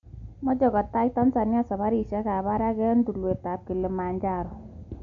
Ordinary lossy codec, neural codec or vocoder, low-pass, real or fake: none; none; 7.2 kHz; real